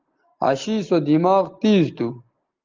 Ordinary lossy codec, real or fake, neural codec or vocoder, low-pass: Opus, 32 kbps; real; none; 7.2 kHz